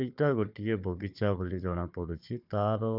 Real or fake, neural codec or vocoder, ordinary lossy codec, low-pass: fake; codec, 16 kHz, 4 kbps, FunCodec, trained on Chinese and English, 50 frames a second; none; 5.4 kHz